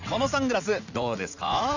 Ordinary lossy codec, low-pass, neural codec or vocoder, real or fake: none; 7.2 kHz; vocoder, 44.1 kHz, 80 mel bands, Vocos; fake